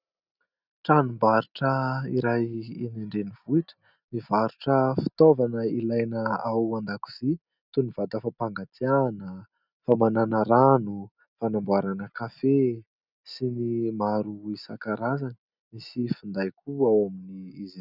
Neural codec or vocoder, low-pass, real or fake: none; 5.4 kHz; real